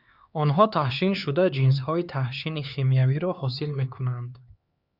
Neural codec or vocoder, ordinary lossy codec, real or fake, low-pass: codec, 16 kHz, 4 kbps, X-Codec, HuBERT features, trained on LibriSpeech; Opus, 64 kbps; fake; 5.4 kHz